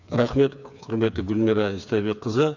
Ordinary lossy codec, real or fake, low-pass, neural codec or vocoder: none; fake; 7.2 kHz; codec, 16 kHz, 4 kbps, FreqCodec, smaller model